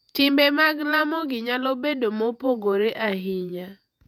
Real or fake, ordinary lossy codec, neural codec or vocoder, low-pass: fake; none; vocoder, 48 kHz, 128 mel bands, Vocos; 19.8 kHz